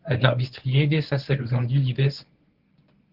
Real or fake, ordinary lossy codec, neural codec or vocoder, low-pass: fake; Opus, 16 kbps; codec, 24 kHz, 0.9 kbps, WavTokenizer, medium speech release version 1; 5.4 kHz